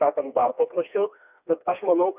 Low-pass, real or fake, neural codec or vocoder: 3.6 kHz; fake; codec, 16 kHz, 2 kbps, FreqCodec, smaller model